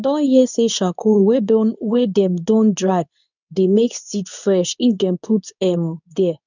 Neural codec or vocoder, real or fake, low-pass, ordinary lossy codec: codec, 24 kHz, 0.9 kbps, WavTokenizer, medium speech release version 2; fake; 7.2 kHz; none